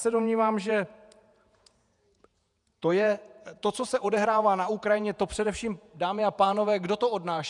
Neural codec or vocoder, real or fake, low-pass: vocoder, 48 kHz, 128 mel bands, Vocos; fake; 10.8 kHz